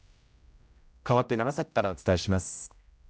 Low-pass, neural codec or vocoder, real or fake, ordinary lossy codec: none; codec, 16 kHz, 0.5 kbps, X-Codec, HuBERT features, trained on general audio; fake; none